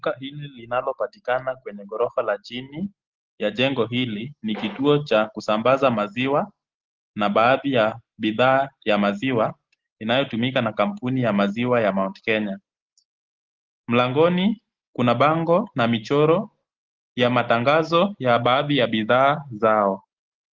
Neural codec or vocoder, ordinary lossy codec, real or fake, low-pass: none; Opus, 16 kbps; real; 7.2 kHz